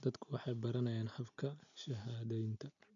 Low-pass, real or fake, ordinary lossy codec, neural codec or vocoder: 7.2 kHz; real; none; none